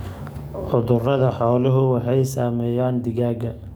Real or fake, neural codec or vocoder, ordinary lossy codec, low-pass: fake; codec, 44.1 kHz, 7.8 kbps, DAC; none; none